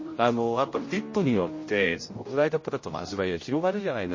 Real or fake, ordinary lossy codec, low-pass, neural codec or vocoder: fake; MP3, 32 kbps; 7.2 kHz; codec, 16 kHz, 0.5 kbps, X-Codec, HuBERT features, trained on general audio